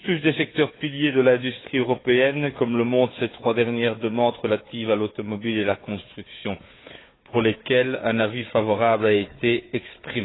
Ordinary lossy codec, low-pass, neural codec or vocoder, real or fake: AAC, 16 kbps; 7.2 kHz; codec, 16 kHz, 4 kbps, FunCodec, trained on Chinese and English, 50 frames a second; fake